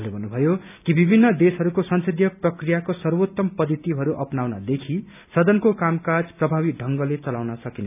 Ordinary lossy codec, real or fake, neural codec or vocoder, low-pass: none; real; none; 3.6 kHz